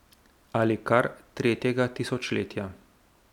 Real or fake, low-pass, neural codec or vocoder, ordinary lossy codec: real; 19.8 kHz; none; none